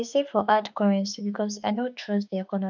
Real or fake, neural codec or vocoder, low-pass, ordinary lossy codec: fake; autoencoder, 48 kHz, 32 numbers a frame, DAC-VAE, trained on Japanese speech; 7.2 kHz; none